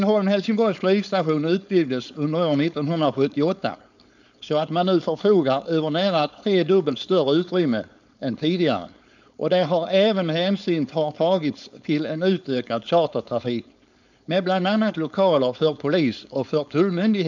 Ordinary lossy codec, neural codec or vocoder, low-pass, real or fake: none; codec, 16 kHz, 4.8 kbps, FACodec; 7.2 kHz; fake